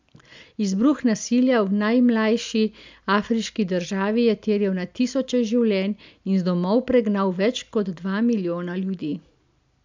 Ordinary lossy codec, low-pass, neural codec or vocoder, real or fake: MP3, 64 kbps; 7.2 kHz; none; real